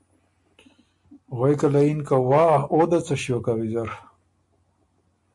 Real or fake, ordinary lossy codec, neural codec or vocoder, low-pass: real; MP3, 64 kbps; none; 10.8 kHz